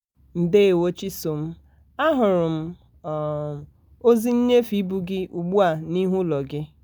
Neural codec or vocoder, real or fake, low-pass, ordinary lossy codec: none; real; none; none